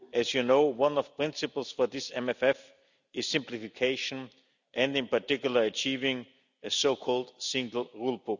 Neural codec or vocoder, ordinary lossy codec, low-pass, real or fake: none; none; 7.2 kHz; real